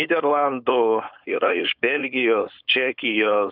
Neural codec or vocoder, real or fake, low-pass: codec, 16 kHz, 4.8 kbps, FACodec; fake; 5.4 kHz